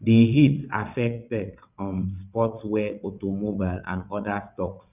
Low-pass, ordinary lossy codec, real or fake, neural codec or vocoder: 3.6 kHz; none; fake; vocoder, 22.05 kHz, 80 mel bands, WaveNeXt